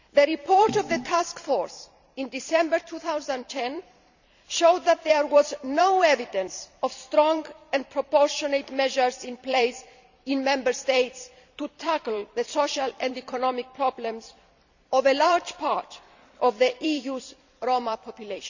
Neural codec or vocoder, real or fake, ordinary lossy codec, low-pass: vocoder, 44.1 kHz, 128 mel bands every 256 samples, BigVGAN v2; fake; none; 7.2 kHz